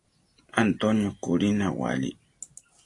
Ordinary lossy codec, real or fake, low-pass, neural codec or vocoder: AAC, 48 kbps; real; 10.8 kHz; none